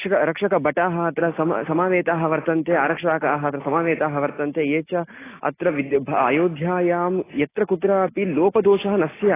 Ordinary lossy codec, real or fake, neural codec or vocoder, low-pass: AAC, 16 kbps; real; none; 3.6 kHz